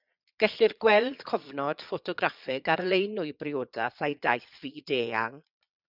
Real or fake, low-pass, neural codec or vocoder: fake; 5.4 kHz; vocoder, 22.05 kHz, 80 mel bands, Vocos